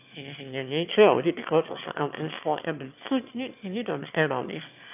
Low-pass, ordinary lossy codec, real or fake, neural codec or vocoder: 3.6 kHz; none; fake; autoencoder, 22.05 kHz, a latent of 192 numbers a frame, VITS, trained on one speaker